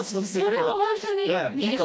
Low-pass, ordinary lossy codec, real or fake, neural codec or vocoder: none; none; fake; codec, 16 kHz, 1 kbps, FreqCodec, smaller model